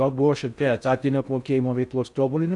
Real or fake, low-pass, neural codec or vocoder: fake; 10.8 kHz; codec, 16 kHz in and 24 kHz out, 0.6 kbps, FocalCodec, streaming, 4096 codes